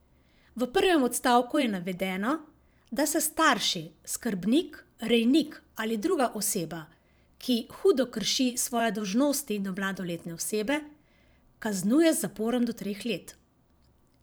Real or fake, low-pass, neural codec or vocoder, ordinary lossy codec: fake; none; vocoder, 44.1 kHz, 128 mel bands every 512 samples, BigVGAN v2; none